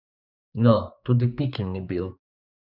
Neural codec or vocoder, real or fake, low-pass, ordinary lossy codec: codec, 16 kHz, 4 kbps, X-Codec, HuBERT features, trained on general audio; fake; 5.4 kHz; none